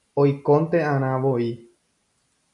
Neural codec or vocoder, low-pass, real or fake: none; 10.8 kHz; real